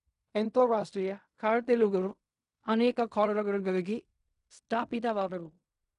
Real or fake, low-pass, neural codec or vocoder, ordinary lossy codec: fake; 10.8 kHz; codec, 16 kHz in and 24 kHz out, 0.4 kbps, LongCat-Audio-Codec, fine tuned four codebook decoder; none